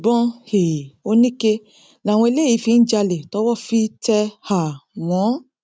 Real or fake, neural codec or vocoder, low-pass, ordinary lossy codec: real; none; none; none